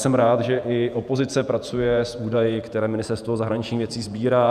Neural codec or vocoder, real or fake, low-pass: vocoder, 48 kHz, 128 mel bands, Vocos; fake; 14.4 kHz